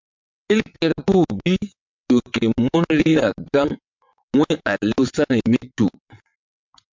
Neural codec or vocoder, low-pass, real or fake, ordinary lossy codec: vocoder, 22.05 kHz, 80 mel bands, WaveNeXt; 7.2 kHz; fake; MP3, 64 kbps